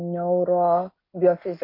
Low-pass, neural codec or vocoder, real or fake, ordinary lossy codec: 5.4 kHz; none; real; MP3, 32 kbps